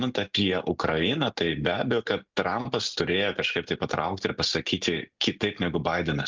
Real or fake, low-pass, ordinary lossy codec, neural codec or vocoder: real; 7.2 kHz; Opus, 24 kbps; none